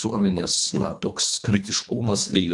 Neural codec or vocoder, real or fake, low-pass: codec, 24 kHz, 1.5 kbps, HILCodec; fake; 10.8 kHz